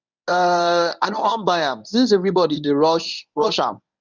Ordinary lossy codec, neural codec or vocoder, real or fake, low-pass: none; codec, 24 kHz, 0.9 kbps, WavTokenizer, medium speech release version 1; fake; 7.2 kHz